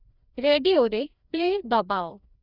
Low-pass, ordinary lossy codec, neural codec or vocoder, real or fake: 5.4 kHz; Opus, 64 kbps; codec, 16 kHz, 1 kbps, FreqCodec, larger model; fake